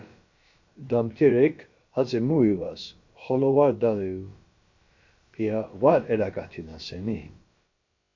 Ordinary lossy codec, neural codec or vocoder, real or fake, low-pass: MP3, 48 kbps; codec, 16 kHz, about 1 kbps, DyCAST, with the encoder's durations; fake; 7.2 kHz